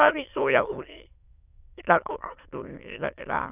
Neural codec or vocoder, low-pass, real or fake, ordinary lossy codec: autoencoder, 22.05 kHz, a latent of 192 numbers a frame, VITS, trained on many speakers; 3.6 kHz; fake; none